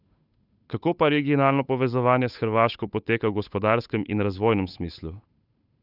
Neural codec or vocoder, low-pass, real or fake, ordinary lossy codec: codec, 16 kHz, 8 kbps, FunCodec, trained on Chinese and English, 25 frames a second; 5.4 kHz; fake; none